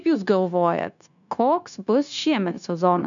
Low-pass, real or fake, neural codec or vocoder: 7.2 kHz; fake; codec, 16 kHz, 0.9 kbps, LongCat-Audio-Codec